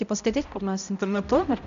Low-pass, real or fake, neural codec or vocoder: 7.2 kHz; fake; codec, 16 kHz, 0.5 kbps, X-Codec, HuBERT features, trained on balanced general audio